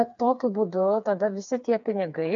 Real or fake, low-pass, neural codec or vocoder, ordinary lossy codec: fake; 7.2 kHz; codec, 16 kHz, 4 kbps, FreqCodec, smaller model; MP3, 64 kbps